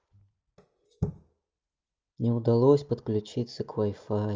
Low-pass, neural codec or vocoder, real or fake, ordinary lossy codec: 7.2 kHz; none; real; Opus, 24 kbps